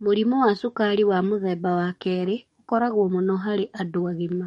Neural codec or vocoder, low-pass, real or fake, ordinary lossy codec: codec, 44.1 kHz, 7.8 kbps, DAC; 19.8 kHz; fake; MP3, 48 kbps